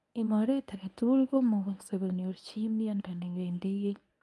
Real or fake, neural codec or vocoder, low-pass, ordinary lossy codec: fake; codec, 24 kHz, 0.9 kbps, WavTokenizer, medium speech release version 1; none; none